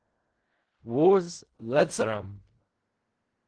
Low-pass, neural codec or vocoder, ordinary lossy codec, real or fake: 9.9 kHz; codec, 16 kHz in and 24 kHz out, 0.4 kbps, LongCat-Audio-Codec, fine tuned four codebook decoder; Opus, 16 kbps; fake